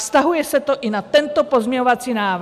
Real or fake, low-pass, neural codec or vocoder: real; 14.4 kHz; none